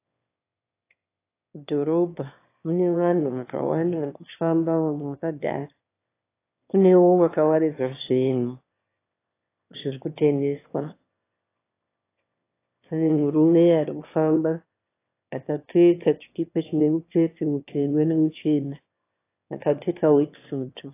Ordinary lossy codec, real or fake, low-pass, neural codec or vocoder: AAC, 24 kbps; fake; 3.6 kHz; autoencoder, 22.05 kHz, a latent of 192 numbers a frame, VITS, trained on one speaker